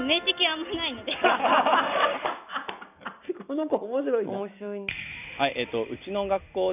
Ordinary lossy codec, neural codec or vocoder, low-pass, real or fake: none; none; 3.6 kHz; real